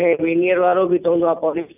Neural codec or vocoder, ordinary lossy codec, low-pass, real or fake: none; none; 3.6 kHz; real